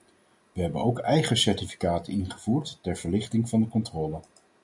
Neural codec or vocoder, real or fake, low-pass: none; real; 10.8 kHz